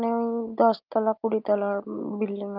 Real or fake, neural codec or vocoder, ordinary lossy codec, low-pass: real; none; Opus, 24 kbps; 5.4 kHz